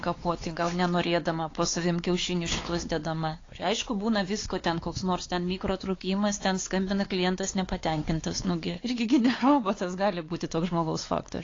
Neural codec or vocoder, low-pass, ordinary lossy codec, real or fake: codec, 16 kHz, 4 kbps, X-Codec, WavLM features, trained on Multilingual LibriSpeech; 7.2 kHz; AAC, 32 kbps; fake